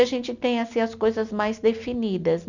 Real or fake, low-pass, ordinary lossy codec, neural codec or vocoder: real; 7.2 kHz; none; none